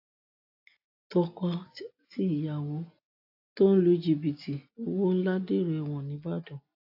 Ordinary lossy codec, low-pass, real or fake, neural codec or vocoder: AAC, 48 kbps; 5.4 kHz; real; none